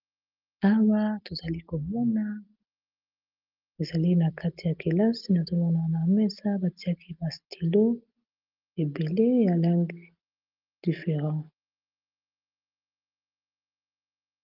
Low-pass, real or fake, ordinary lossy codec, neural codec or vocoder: 5.4 kHz; real; Opus, 24 kbps; none